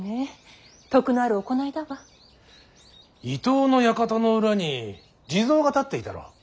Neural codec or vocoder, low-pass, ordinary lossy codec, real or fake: none; none; none; real